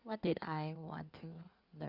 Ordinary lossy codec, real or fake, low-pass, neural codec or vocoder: Opus, 64 kbps; fake; 5.4 kHz; codec, 16 kHz in and 24 kHz out, 2.2 kbps, FireRedTTS-2 codec